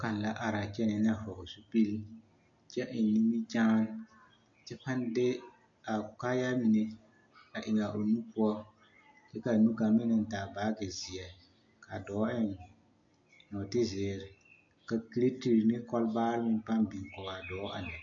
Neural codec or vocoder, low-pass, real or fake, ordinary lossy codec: none; 7.2 kHz; real; MP3, 48 kbps